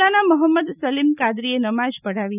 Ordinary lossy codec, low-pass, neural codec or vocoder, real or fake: none; 3.6 kHz; none; real